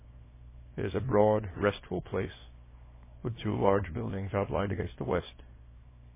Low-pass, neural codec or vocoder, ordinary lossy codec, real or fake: 3.6 kHz; codec, 24 kHz, 0.9 kbps, WavTokenizer, small release; MP3, 16 kbps; fake